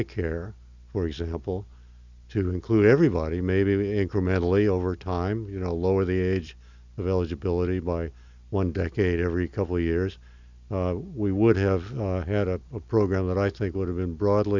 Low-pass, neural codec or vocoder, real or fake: 7.2 kHz; none; real